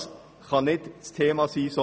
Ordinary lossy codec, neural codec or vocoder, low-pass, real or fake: none; none; none; real